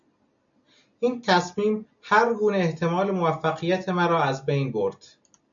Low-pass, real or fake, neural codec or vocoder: 7.2 kHz; real; none